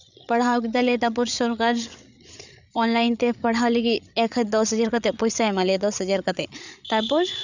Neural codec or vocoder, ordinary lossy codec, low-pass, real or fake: codec, 16 kHz, 8 kbps, FreqCodec, larger model; none; 7.2 kHz; fake